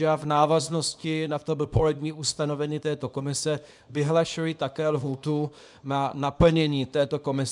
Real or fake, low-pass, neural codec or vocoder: fake; 10.8 kHz; codec, 24 kHz, 0.9 kbps, WavTokenizer, small release